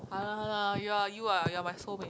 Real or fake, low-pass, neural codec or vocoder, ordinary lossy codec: real; none; none; none